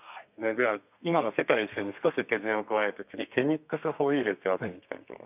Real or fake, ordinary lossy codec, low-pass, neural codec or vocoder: fake; none; 3.6 kHz; codec, 32 kHz, 1.9 kbps, SNAC